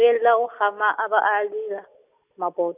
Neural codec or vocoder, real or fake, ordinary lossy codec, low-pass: none; real; none; 3.6 kHz